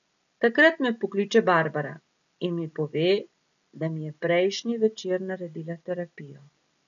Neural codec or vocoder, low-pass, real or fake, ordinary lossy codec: none; 7.2 kHz; real; none